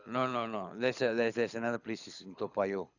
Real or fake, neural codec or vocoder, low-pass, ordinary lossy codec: fake; codec, 24 kHz, 6 kbps, HILCodec; 7.2 kHz; none